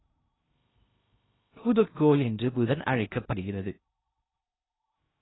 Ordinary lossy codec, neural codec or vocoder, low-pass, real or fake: AAC, 16 kbps; codec, 16 kHz in and 24 kHz out, 0.6 kbps, FocalCodec, streaming, 2048 codes; 7.2 kHz; fake